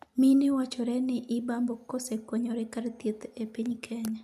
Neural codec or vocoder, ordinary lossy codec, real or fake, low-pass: none; none; real; 14.4 kHz